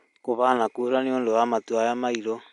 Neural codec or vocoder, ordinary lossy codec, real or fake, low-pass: none; MP3, 64 kbps; real; 10.8 kHz